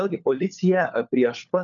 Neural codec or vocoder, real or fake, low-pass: codec, 16 kHz, 4 kbps, FunCodec, trained on LibriTTS, 50 frames a second; fake; 7.2 kHz